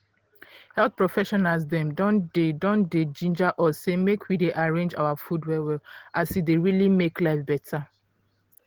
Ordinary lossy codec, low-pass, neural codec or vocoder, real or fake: Opus, 16 kbps; 19.8 kHz; none; real